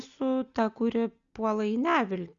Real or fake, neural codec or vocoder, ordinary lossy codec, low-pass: real; none; Opus, 64 kbps; 7.2 kHz